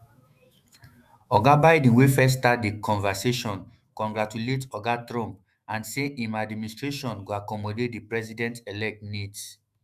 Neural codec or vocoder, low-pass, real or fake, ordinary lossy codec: autoencoder, 48 kHz, 128 numbers a frame, DAC-VAE, trained on Japanese speech; 14.4 kHz; fake; Opus, 64 kbps